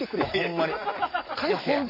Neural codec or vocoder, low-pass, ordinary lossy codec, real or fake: none; 5.4 kHz; AAC, 32 kbps; real